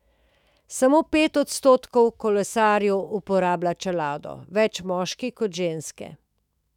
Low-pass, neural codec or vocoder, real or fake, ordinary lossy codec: 19.8 kHz; autoencoder, 48 kHz, 128 numbers a frame, DAC-VAE, trained on Japanese speech; fake; none